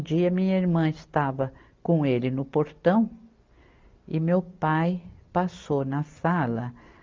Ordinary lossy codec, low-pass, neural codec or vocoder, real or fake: Opus, 24 kbps; 7.2 kHz; none; real